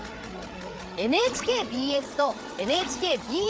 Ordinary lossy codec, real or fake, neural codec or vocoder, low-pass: none; fake; codec, 16 kHz, 8 kbps, FreqCodec, larger model; none